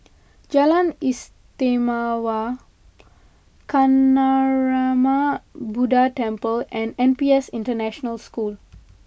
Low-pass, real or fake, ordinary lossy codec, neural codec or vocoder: none; real; none; none